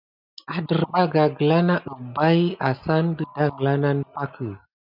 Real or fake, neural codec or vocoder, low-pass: real; none; 5.4 kHz